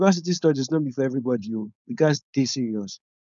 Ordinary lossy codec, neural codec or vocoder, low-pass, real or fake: none; codec, 16 kHz, 4.8 kbps, FACodec; 7.2 kHz; fake